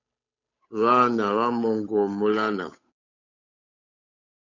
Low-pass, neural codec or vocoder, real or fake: 7.2 kHz; codec, 16 kHz, 8 kbps, FunCodec, trained on Chinese and English, 25 frames a second; fake